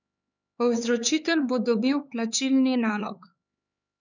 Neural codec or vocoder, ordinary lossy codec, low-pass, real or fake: codec, 16 kHz, 4 kbps, X-Codec, HuBERT features, trained on LibriSpeech; none; 7.2 kHz; fake